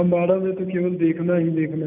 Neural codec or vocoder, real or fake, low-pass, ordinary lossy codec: none; real; 3.6 kHz; none